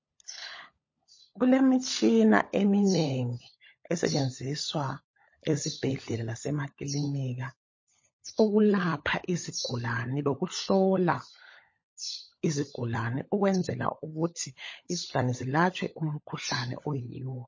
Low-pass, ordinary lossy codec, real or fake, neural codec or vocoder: 7.2 kHz; MP3, 32 kbps; fake; codec, 16 kHz, 16 kbps, FunCodec, trained on LibriTTS, 50 frames a second